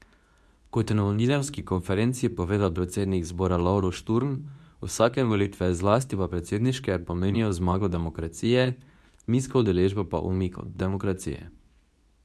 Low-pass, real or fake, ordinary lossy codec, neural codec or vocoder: none; fake; none; codec, 24 kHz, 0.9 kbps, WavTokenizer, medium speech release version 2